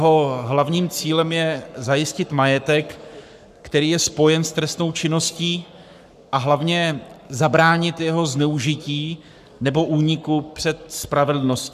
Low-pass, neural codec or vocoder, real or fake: 14.4 kHz; codec, 44.1 kHz, 7.8 kbps, Pupu-Codec; fake